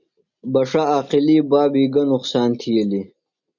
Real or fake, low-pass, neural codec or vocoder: real; 7.2 kHz; none